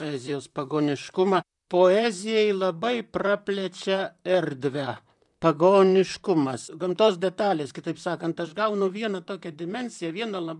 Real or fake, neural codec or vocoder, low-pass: fake; vocoder, 44.1 kHz, 128 mel bands, Pupu-Vocoder; 10.8 kHz